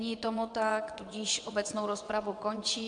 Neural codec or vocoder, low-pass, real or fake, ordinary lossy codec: vocoder, 22.05 kHz, 80 mel bands, WaveNeXt; 9.9 kHz; fake; MP3, 64 kbps